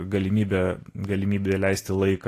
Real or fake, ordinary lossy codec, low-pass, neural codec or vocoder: real; AAC, 48 kbps; 14.4 kHz; none